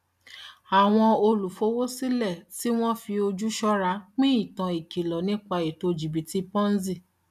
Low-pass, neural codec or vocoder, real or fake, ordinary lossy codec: 14.4 kHz; none; real; none